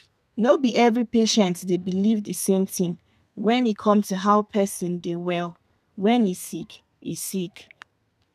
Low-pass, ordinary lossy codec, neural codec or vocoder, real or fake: 14.4 kHz; none; codec, 32 kHz, 1.9 kbps, SNAC; fake